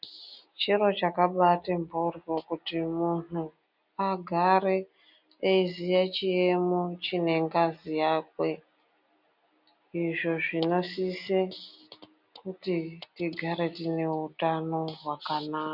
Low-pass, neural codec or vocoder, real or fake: 5.4 kHz; none; real